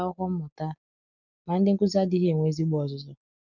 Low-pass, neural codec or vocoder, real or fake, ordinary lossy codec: 7.2 kHz; none; real; none